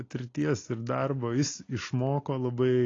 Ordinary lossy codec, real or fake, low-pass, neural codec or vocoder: AAC, 32 kbps; real; 7.2 kHz; none